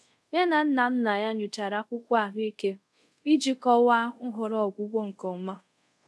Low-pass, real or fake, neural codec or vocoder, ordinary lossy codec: none; fake; codec, 24 kHz, 0.5 kbps, DualCodec; none